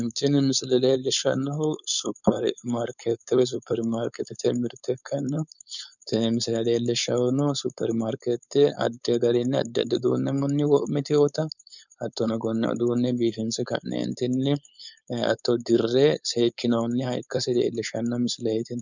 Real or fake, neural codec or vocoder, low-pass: fake; codec, 16 kHz, 4.8 kbps, FACodec; 7.2 kHz